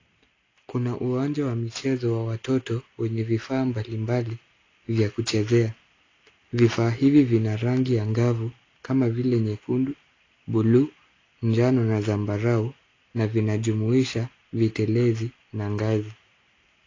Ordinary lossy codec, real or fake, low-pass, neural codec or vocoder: AAC, 32 kbps; real; 7.2 kHz; none